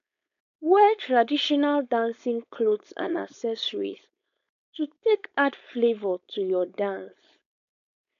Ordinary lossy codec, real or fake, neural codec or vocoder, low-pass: none; fake; codec, 16 kHz, 4.8 kbps, FACodec; 7.2 kHz